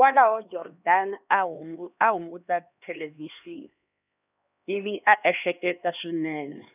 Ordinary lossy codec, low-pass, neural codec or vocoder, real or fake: none; 3.6 kHz; codec, 16 kHz, 2 kbps, X-Codec, HuBERT features, trained on LibriSpeech; fake